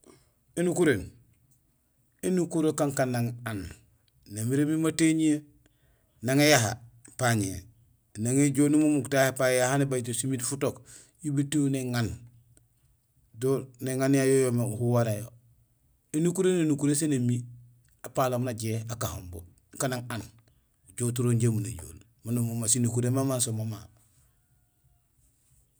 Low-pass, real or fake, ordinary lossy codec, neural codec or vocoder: none; real; none; none